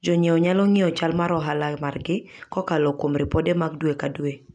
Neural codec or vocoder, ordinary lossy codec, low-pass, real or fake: none; none; 9.9 kHz; real